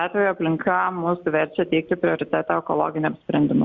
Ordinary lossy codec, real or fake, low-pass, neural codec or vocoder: Opus, 64 kbps; real; 7.2 kHz; none